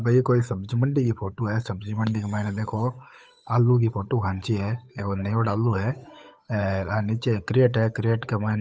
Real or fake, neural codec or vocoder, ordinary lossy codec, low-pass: fake; codec, 16 kHz, 8 kbps, FunCodec, trained on Chinese and English, 25 frames a second; none; none